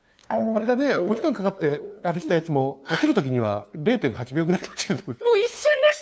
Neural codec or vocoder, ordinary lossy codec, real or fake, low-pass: codec, 16 kHz, 2 kbps, FunCodec, trained on LibriTTS, 25 frames a second; none; fake; none